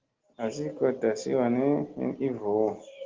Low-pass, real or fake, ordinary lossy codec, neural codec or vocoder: 7.2 kHz; real; Opus, 16 kbps; none